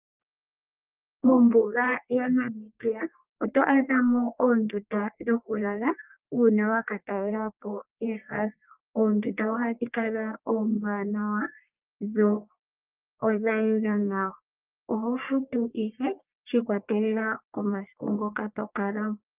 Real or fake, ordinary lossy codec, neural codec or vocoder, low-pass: fake; Opus, 24 kbps; codec, 44.1 kHz, 1.7 kbps, Pupu-Codec; 3.6 kHz